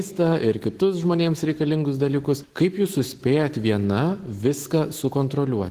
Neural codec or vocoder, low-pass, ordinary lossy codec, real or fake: autoencoder, 48 kHz, 128 numbers a frame, DAC-VAE, trained on Japanese speech; 14.4 kHz; Opus, 16 kbps; fake